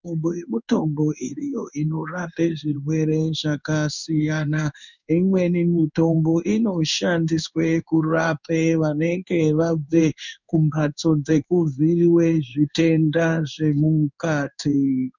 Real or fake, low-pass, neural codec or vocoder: fake; 7.2 kHz; codec, 16 kHz in and 24 kHz out, 1 kbps, XY-Tokenizer